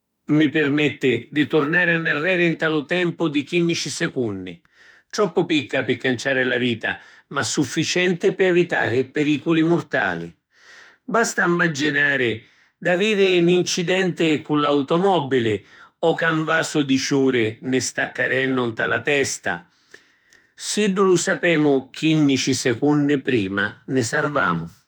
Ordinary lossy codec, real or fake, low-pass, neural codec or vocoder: none; fake; none; autoencoder, 48 kHz, 32 numbers a frame, DAC-VAE, trained on Japanese speech